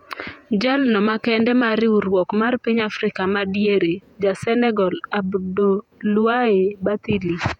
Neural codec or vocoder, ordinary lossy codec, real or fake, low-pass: vocoder, 48 kHz, 128 mel bands, Vocos; none; fake; 19.8 kHz